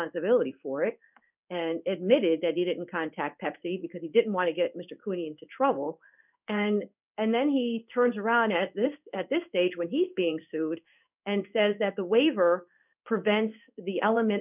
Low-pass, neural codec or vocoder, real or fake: 3.6 kHz; codec, 16 kHz in and 24 kHz out, 1 kbps, XY-Tokenizer; fake